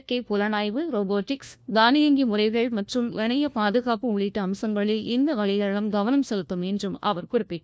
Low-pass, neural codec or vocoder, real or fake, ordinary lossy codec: none; codec, 16 kHz, 1 kbps, FunCodec, trained on LibriTTS, 50 frames a second; fake; none